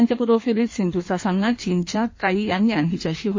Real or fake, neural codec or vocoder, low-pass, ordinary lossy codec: fake; codec, 16 kHz in and 24 kHz out, 1.1 kbps, FireRedTTS-2 codec; 7.2 kHz; MP3, 32 kbps